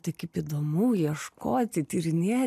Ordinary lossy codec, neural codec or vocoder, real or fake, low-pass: AAC, 96 kbps; none; real; 14.4 kHz